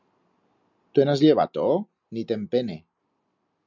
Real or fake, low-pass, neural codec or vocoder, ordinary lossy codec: real; 7.2 kHz; none; MP3, 64 kbps